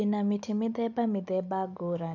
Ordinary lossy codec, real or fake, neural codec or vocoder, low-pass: none; real; none; 7.2 kHz